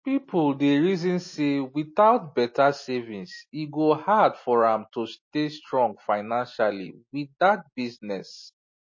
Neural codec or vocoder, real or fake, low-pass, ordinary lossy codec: none; real; 7.2 kHz; MP3, 32 kbps